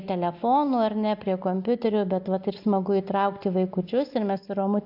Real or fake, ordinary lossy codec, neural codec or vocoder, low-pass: real; AAC, 48 kbps; none; 5.4 kHz